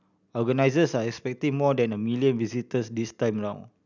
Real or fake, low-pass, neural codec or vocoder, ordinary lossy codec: real; 7.2 kHz; none; none